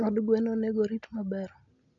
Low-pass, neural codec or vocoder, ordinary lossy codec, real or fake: 7.2 kHz; none; none; real